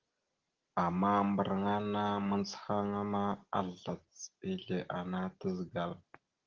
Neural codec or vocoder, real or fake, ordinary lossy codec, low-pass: none; real; Opus, 16 kbps; 7.2 kHz